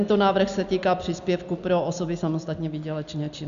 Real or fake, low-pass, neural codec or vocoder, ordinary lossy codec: real; 7.2 kHz; none; MP3, 96 kbps